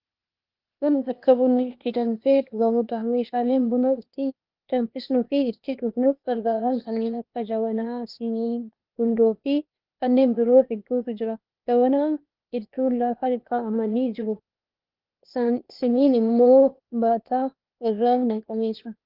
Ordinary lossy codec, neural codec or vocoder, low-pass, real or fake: Opus, 32 kbps; codec, 16 kHz, 0.8 kbps, ZipCodec; 5.4 kHz; fake